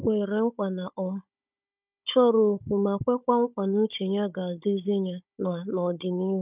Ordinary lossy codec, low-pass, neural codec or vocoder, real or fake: none; 3.6 kHz; codec, 16 kHz, 16 kbps, FunCodec, trained on Chinese and English, 50 frames a second; fake